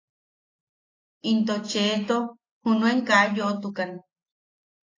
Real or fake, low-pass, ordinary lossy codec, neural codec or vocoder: real; 7.2 kHz; AAC, 32 kbps; none